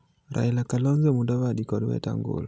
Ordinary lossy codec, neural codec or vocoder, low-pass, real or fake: none; none; none; real